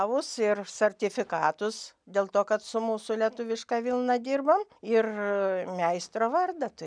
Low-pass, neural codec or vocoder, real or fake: 9.9 kHz; none; real